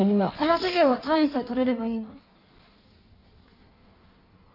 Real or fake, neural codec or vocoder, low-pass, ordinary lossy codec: fake; codec, 16 kHz in and 24 kHz out, 1.1 kbps, FireRedTTS-2 codec; 5.4 kHz; none